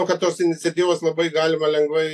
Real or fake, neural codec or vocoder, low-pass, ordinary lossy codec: real; none; 14.4 kHz; AAC, 64 kbps